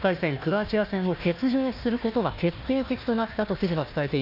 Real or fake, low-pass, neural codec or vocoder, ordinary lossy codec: fake; 5.4 kHz; codec, 16 kHz, 1 kbps, FunCodec, trained on Chinese and English, 50 frames a second; none